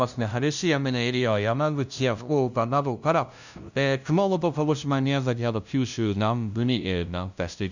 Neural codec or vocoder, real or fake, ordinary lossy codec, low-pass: codec, 16 kHz, 0.5 kbps, FunCodec, trained on LibriTTS, 25 frames a second; fake; none; 7.2 kHz